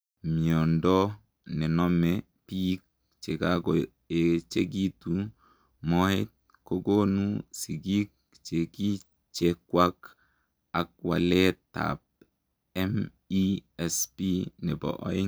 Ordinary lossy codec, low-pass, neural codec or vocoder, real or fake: none; none; none; real